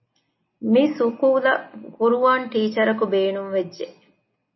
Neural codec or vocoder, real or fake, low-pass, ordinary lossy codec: none; real; 7.2 kHz; MP3, 24 kbps